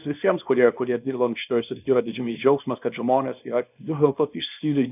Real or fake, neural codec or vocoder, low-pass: fake; codec, 24 kHz, 0.9 kbps, WavTokenizer, small release; 3.6 kHz